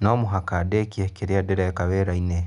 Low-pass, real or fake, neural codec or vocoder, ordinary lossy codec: 10.8 kHz; real; none; none